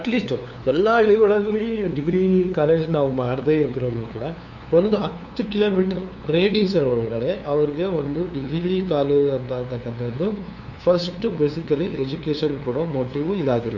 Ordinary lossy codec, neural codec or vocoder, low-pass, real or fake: none; codec, 16 kHz, 2 kbps, FunCodec, trained on LibriTTS, 25 frames a second; 7.2 kHz; fake